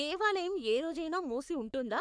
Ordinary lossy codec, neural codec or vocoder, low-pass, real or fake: none; codec, 44.1 kHz, 3.4 kbps, Pupu-Codec; 9.9 kHz; fake